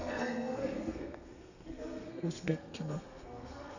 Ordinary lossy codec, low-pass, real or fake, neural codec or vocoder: none; 7.2 kHz; fake; codec, 32 kHz, 1.9 kbps, SNAC